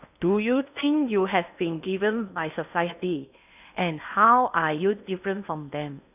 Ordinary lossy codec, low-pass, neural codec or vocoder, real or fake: none; 3.6 kHz; codec, 16 kHz in and 24 kHz out, 0.8 kbps, FocalCodec, streaming, 65536 codes; fake